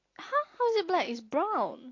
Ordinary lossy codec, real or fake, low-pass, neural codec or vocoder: AAC, 32 kbps; real; 7.2 kHz; none